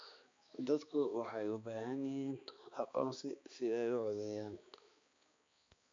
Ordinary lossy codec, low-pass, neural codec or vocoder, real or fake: AAC, 48 kbps; 7.2 kHz; codec, 16 kHz, 2 kbps, X-Codec, HuBERT features, trained on balanced general audio; fake